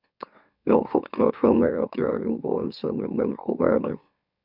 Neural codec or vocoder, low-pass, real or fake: autoencoder, 44.1 kHz, a latent of 192 numbers a frame, MeloTTS; 5.4 kHz; fake